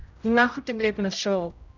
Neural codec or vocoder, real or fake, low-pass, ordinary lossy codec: codec, 16 kHz, 0.5 kbps, X-Codec, HuBERT features, trained on general audio; fake; 7.2 kHz; none